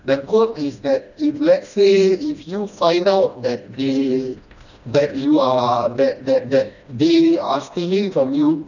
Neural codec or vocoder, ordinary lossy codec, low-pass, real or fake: codec, 16 kHz, 1 kbps, FreqCodec, smaller model; none; 7.2 kHz; fake